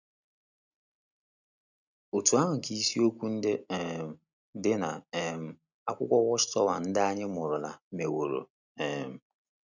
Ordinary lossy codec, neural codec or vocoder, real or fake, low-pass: none; none; real; 7.2 kHz